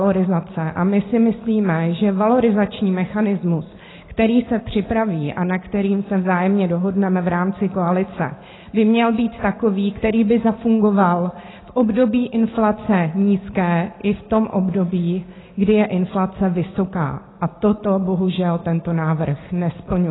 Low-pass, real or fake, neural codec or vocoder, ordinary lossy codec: 7.2 kHz; real; none; AAC, 16 kbps